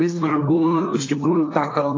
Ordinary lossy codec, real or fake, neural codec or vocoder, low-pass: AAC, 32 kbps; fake; codec, 24 kHz, 1 kbps, SNAC; 7.2 kHz